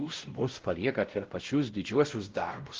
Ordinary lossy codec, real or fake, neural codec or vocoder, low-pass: Opus, 16 kbps; fake; codec, 16 kHz, 0.5 kbps, X-Codec, WavLM features, trained on Multilingual LibriSpeech; 7.2 kHz